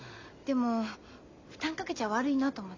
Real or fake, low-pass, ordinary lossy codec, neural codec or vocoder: real; 7.2 kHz; MP3, 64 kbps; none